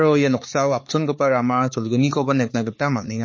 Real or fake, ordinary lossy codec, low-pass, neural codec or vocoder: fake; MP3, 32 kbps; 7.2 kHz; codec, 16 kHz, 4 kbps, X-Codec, HuBERT features, trained on balanced general audio